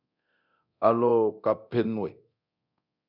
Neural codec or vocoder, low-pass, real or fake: codec, 24 kHz, 0.9 kbps, DualCodec; 5.4 kHz; fake